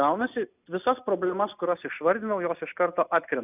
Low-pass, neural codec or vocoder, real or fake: 3.6 kHz; none; real